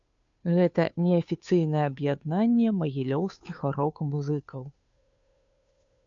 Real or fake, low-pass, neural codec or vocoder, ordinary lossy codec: fake; 7.2 kHz; codec, 16 kHz, 2 kbps, FunCodec, trained on Chinese and English, 25 frames a second; AAC, 64 kbps